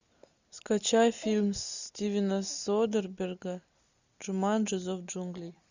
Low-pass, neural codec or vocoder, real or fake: 7.2 kHz; none; real